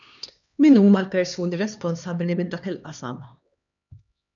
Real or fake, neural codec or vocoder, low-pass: fake; codec, 16 kHz, 2 kbps, X-Codec, HuBERT features, trained on LibriSpeech; 7.2 kHz